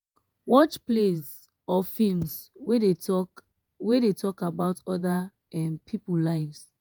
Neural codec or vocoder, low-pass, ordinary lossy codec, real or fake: vocoder, 48 kHz, 128 mel bands, Vocos; none; none; fake